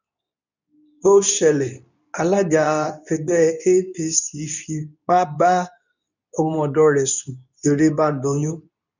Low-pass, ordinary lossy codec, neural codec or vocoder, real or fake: 7.2 kHz; none; codec, 24 kHz, 0.9 kbps, WavTokenizer, medium speech release version 2; fake